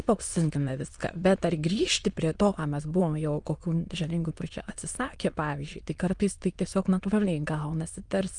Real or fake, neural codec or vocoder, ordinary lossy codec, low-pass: fake; autoencoder, 22.05 kHz, a latent of 192 numbers a frame, VITS, trained on many speakers; Opus, 32 kbps; 9.9 kHz